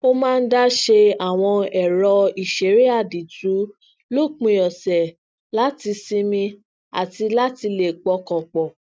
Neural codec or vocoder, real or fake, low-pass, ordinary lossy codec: none; real; none; none